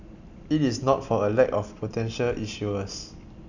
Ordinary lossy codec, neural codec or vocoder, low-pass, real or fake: none; none; 7.2 kHz; real